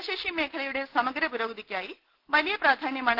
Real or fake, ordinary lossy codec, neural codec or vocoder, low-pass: real; Opus, 16 kbps; none; 5.4 kHz